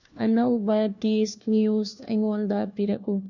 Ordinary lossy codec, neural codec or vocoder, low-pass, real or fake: none; codec, 16 kHz, 1 kbps, FunCodec, trained on LibriTTS, 50 frames a second; 7.2 kHz; fake